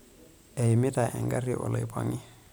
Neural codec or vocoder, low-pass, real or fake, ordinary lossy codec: vocoder, 44.1 kHz, 128 mel bands every 512 samples, BigVGAN v2; none; fake; none